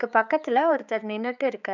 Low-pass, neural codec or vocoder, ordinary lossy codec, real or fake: 7.2 kHz; codec, 16 kHz, 4 kbps, X-Codec, HuBERT features, trained on balanced general audio; none; fake